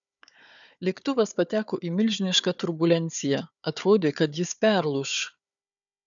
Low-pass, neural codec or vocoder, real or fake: 7.2 kHz; codec, 16 kHz, 4 kbps, FunCodec, trained on Chinese and English, 50 frames a second; fake